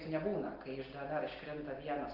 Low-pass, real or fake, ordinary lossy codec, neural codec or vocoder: 5.4 kHz; real; Opus, 16 kbps; none